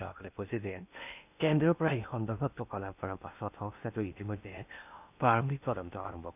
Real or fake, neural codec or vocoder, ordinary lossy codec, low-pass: fake; codec, 16 kHz in and 24 kHz out, 0.8 kbps, FocalCodec, streaming, 65536 codes; none; 3.6 kHz